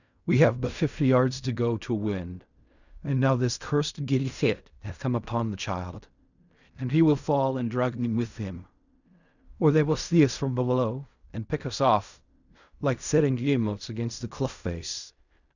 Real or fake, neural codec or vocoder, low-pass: fake; codec, 16 kHz in and 24 kHz out, 0.4 kbps, LongCat-Audio-Codec, fine tuned four codebook decoder; 7.2 kHz